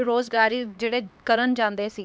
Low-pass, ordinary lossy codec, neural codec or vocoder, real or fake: none; none; codec, 16 kHz, 2 kbps, X-Codec, HuBERT features, trained on LibriSpeech; fake